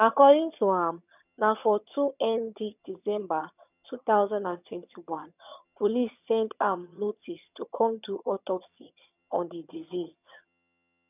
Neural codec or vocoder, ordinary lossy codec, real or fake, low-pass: vocoder, 22.05 kHz, 80 mel bands, HiFi-GAN; none; fake; 3.6 kHz